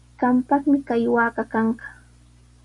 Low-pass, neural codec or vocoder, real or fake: 10.8 kHz; none; real